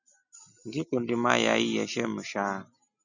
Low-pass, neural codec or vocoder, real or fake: 7.2 kHz; none; real